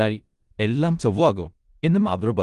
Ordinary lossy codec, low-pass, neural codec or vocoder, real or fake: Opus, 24 kbps; 10.8 kHz; codec, 16 kHz in and 24 kHz out, 0.4 kbps, LongCat-Audio-Codec, four codebook decoder; fake